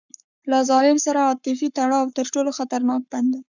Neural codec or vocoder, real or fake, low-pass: codec, 44.1 kHz, 7.8 kbps, Pupu-Codec; fake; 7.2 kHz